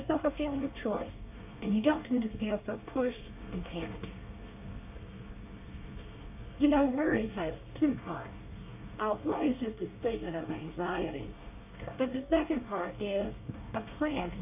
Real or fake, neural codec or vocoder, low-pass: fake; codec, 24 kHz, 1 kbps, SNAC; 3.6 kHz